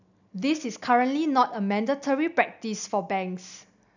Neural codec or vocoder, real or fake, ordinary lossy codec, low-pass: none; real; none; 7.2 kHz